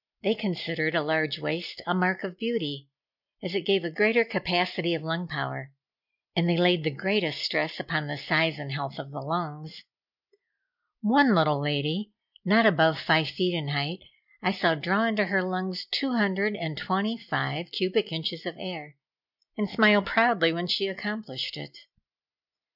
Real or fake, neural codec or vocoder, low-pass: real; none; 5.4 kHz